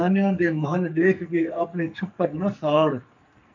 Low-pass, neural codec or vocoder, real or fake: 7.2 kHz; codec, 44.1 kHz, 2.6 kbps, SNAC; fake